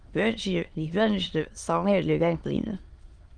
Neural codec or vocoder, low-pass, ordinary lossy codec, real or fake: autoencoder, 22.05 kHz, a latent of 192 numbers a frame, VITS, trained on many speakers; 9.9 kHz; Opus, 24 kbps; fake